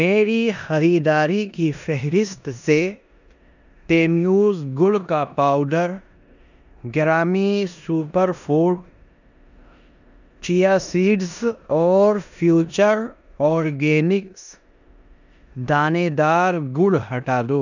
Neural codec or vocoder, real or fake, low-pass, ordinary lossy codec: codec, 16 kHz in and 24 kHz out, 0.9 kbps, LongCat-Audio-Codec, four codebook decoder; fake; 7.2 kHz; none